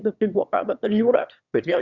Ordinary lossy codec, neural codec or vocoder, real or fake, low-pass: Opus, 64 kbps; autoencoder, 22.05 kHz, a latent of 192 numbers a frame, VITS, trained on one speaker; fake; 7.2 kHz